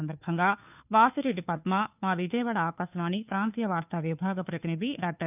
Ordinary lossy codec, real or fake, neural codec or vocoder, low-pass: none; fake; codec, 16 kHz, 2 kbps, FunCodec, trained on Chinese and English, 25 frames a second; 3.6 kHz